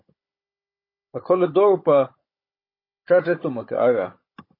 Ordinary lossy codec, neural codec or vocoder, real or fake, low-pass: MP3, 24 kbps; codec, 16 kHz, 16 kbps, FunCodec, trained on Chinese and English, 50 frames a second; fake; 5.4 kHz